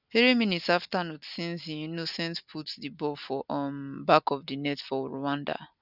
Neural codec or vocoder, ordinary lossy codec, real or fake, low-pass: none; none; real; 5.4 kHz